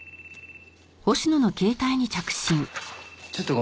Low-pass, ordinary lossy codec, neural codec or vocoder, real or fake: none; none; none; real